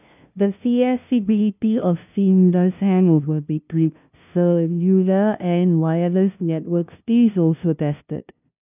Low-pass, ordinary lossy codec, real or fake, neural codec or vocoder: 3.6 kHz; none; fake; codec, 16 kHz, 0.5 kbps, FunCodec, trained on LibriTTS, 25 frames a second